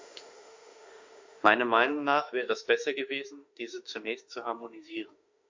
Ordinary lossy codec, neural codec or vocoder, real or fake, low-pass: MP3, 48 kbps; autoencoder, 48 kHz, 32 numbers a frame, DAC-VAE, trained on Japanese speech; fake; 7.2 kHz